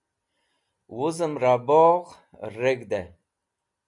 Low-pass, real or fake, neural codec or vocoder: 10.8 kHz; real; none